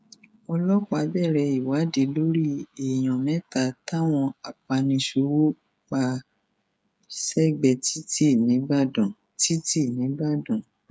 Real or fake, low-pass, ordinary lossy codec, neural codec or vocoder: fake; none; none; codec, 16 kHz, 16 kbps, FreqCodec, smaller model